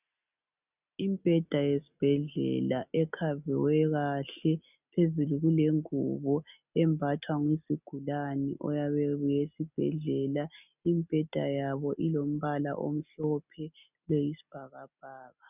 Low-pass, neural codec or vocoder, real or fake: 3.6 kHz; none; real